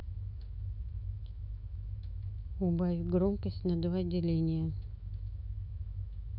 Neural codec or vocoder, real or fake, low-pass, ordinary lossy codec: autoencoder, 48 kHz, 128 numbers a frame, DAC-VAE, trained on Japanese speech; fake; 5.4 kHz; none